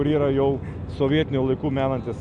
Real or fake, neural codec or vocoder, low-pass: real; none; 10.8 kHz